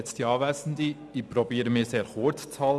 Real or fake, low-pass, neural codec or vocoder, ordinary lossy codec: real; none; none; none